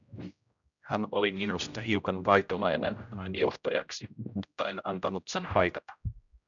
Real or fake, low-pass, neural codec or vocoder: fake; 7.2 kHz; codec, 16 kHz, 0.5 kbps, X-Codec, HuBERT features, trained on general audio